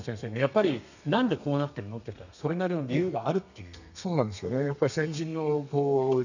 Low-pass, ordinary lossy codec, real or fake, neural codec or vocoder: 7.2 kHz; none; fake; codec, 32 kHz, 1.9 kbps, SNAC